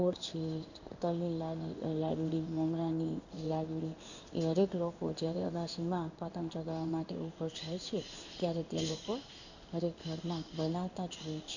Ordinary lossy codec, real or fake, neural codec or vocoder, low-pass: none; fake; codec, 16 kHz in and 24 kHz out, 1 kbps, XY-Tokenizer; 7.2 kHz